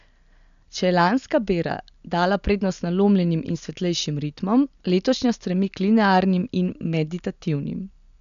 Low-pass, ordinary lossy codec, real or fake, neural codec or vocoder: 7.2 kHz; none; real; none